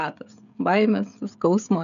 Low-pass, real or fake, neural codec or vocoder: 7.2 kHz; fake; codec, 16 kHz, 16 kbps, FreqCodec, smaller model